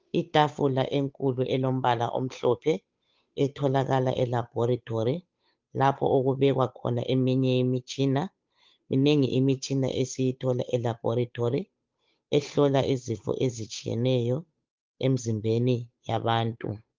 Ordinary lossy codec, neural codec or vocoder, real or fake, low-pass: Opus, 32 kbps; codec, 16 kHz, 8 kbps, FunCodec, trained on Chinese and English, 25 frames a second; fake; 7.2 kHz